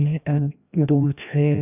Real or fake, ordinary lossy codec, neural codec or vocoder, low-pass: fake; none; codec, 16 kHz, 1 kbps, FreqCodec, larger model; 3.6 kHz